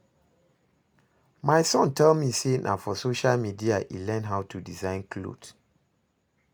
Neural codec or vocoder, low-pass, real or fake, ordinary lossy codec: none; none; real; none